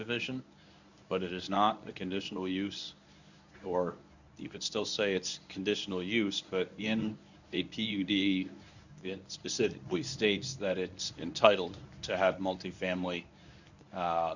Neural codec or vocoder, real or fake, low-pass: codec, 24 kHz, 0.9 kbps, WavTokenizer, medium speech release version 1; fake; 7.2 kHz